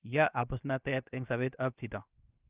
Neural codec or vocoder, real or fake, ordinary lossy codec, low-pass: codec, 16 kHz, 0.7 kbps, FocalCodec; fake; Opus, 24 kbps; 3.6 kHz